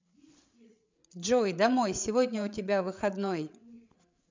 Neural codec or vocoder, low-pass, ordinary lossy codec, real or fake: codec, 16 kHz, 8 kbps, FreqCodec, larger model; 7.2 kHz; MP3, 64 kbps; fake